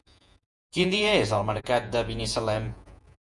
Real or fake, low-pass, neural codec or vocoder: fake; 10.8 kHz; vocoder, 48 kHz, 128 mel bands, Vocos